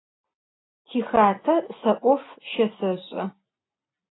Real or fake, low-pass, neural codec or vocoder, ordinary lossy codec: real; 7.2 kHz; none; AAC, 16 kbps